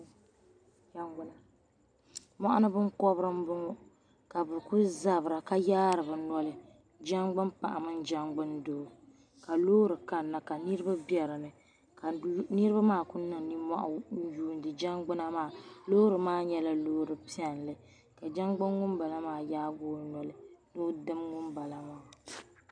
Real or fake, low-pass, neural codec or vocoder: real; 9.9 kHz; none